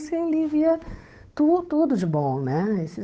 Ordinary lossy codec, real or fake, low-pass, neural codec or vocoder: none; fake; none; codec, 16 kHz, 8 kbps, FunCodec, trained on Chinese and English, 25 frames a second